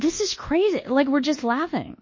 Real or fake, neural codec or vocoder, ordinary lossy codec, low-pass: fake; codec, 24 kHz, 1.2 kbps, DualCodec; MP3, 32 kbps; 7.2 kHz